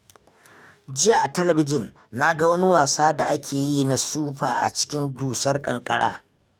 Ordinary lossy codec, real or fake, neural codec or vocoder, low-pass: none; fake; codec, 44.1 kHz, 2.6 kbps, DAC; 19.8 kHz